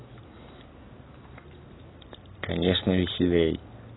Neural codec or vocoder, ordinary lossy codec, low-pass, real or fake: none; AAC, 16 kbps; 7.2 kHz; real